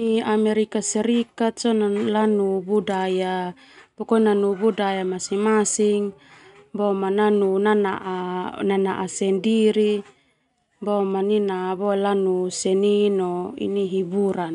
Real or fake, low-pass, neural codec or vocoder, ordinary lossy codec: real; 10.8 kHz; none; none